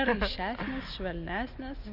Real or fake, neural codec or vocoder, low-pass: real; none; 5.4 kHz